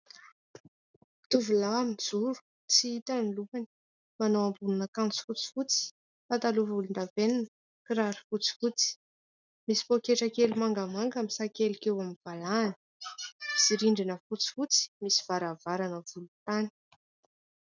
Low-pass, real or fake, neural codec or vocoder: 7.2 kHz; real; none